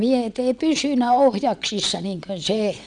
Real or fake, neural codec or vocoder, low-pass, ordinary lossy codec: fake; vocoder, 22.05 kHz, 80 mel bands, WaveNeXt; 9.9 kHz; none